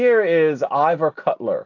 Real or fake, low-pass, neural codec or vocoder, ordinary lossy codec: real; 7.2 kHz; none; AAC, 48 kbps